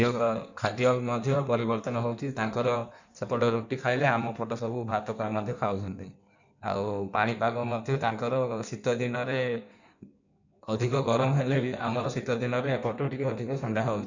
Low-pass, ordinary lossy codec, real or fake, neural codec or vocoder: 7.2 kHz; none; fake; codec, 16 kHz in and 24 kHz out, 1.1 kbps, FireRedTTS-2 codec